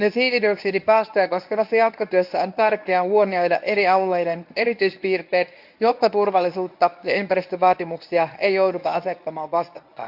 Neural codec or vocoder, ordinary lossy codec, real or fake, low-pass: codec, 24 kHz, 0.9 kbps, WavTokenizer, medium speech release version 1; AAC, 48 kbps; fake; 5.4 kHz